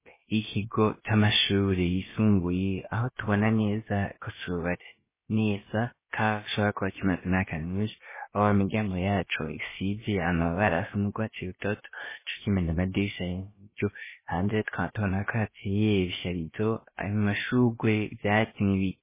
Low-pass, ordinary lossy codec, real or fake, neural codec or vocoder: 3.6 kHz; MP3, 16 kbps; fake; codec, 16 kHz, about 1 kbps, DyCAST, with the encoder's durations